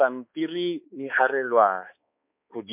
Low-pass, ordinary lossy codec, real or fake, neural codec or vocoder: 3.6 kHz; MP3, 32 kbps; fake; codec, 16 kHz, 2 kbps, X-Codec, HuBERT features, trained on balanced general audio